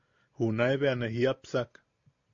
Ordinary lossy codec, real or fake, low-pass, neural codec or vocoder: AAC, 48 kbps; real; 7.2 kHz; none